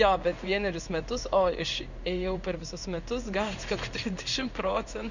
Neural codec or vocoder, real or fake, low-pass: codec, 16 kHz in and 24 kHz out, 1 kbps, XY-Tokenizer; fake; 7.2 kHz